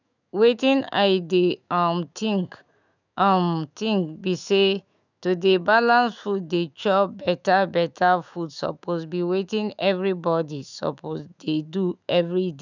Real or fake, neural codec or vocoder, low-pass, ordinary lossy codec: fake; autoencoder, 48 kHz, 128 numbers a frame, DAC-VAE, trained on Japanese speech; 7.2 kHz; none